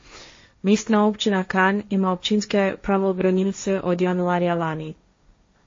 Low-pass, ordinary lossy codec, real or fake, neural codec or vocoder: 7.2 kHz; MP3, 32 kbps; fake; codec, 16 kHz, 1.1 kbps, Voila-Tokenizer